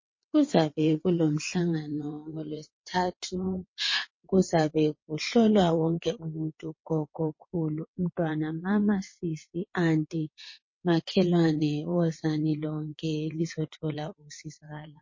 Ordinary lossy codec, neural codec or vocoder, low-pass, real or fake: MP3, 32 kbps; vocoder, 22.05 kHz, 80 mel bands, WaveNeXt; 7.2 kHz; fake